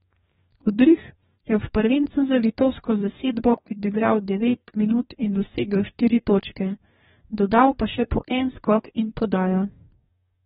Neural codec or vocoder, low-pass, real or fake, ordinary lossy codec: codec, 44.1 kHz, 2.6 kbps, DAC; 19.8 kHz; fake; AAC, 16 kbps